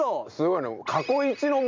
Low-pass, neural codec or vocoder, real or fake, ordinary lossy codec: 7.2 kHz; none; real; MP3, 64 kbps